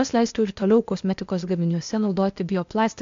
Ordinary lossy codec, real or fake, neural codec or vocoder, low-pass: AAC, 64 kbps; fake; codec, 16 kHz, 0.8 kbps, ZipCodec; 7.2 kHz